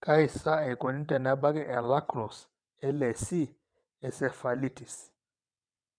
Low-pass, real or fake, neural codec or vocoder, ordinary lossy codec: 9.9 kHz; fake; vocoder, 22.05 kHz, 80 mel bands, WaveNeXt; none